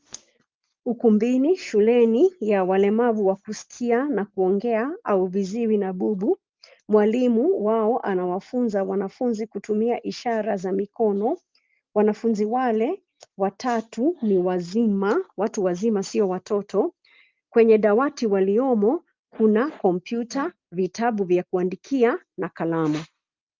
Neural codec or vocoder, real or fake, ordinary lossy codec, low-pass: none; real; Opus, 24 kbps; 7.2 kHz